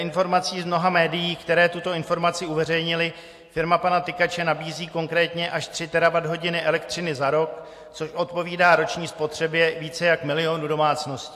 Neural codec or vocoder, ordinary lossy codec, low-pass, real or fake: none; AAC, 64 kbps; 14.4 kHz; real